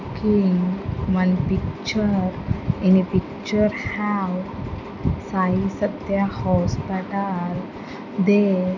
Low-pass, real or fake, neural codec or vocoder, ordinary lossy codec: 7.2 kHz; real; none; none